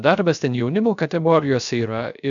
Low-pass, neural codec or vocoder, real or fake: 7.2 kHz; codec, 16 kHz, 0.3 kbps, FocalCodec; fake